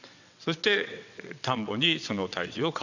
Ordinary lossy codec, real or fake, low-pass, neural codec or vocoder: none; fake; 7.2 kHz; vocoder, 22.05 kHz, 80 mel bands, WaveNeXt